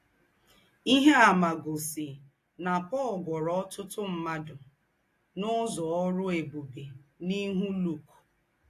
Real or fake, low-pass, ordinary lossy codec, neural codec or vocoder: real; 14.4 kHz; AAC, 48 kbps; none